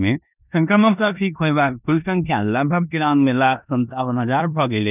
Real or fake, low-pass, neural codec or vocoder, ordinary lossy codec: fake; 3.6 kHz; codec, 16 kHz in and 24 kHz out, 0.9 kbps, LongCat-Audio-Codec, four codebook decoder; none